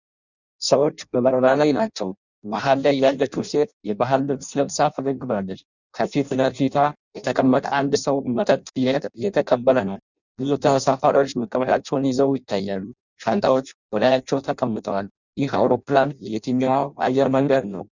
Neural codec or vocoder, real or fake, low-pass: codec, 16 kHz in and 24 kHz out, 0.6 kbps, FireRedTTS-2 codec; fake; 7.2 kHz